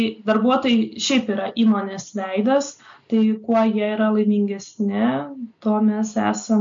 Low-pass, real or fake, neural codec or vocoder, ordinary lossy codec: 7.2 kHz; real; none; MP3, 48 kbps